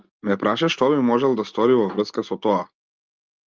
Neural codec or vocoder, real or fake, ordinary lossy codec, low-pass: none; real; Opus, 24 kbps; 7.2 kHz